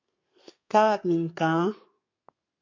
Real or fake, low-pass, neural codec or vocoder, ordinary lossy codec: fake; 7.2 kHz; codec, 32 kHz, 1.9 kbps, SNAC; MP3, 48 kbps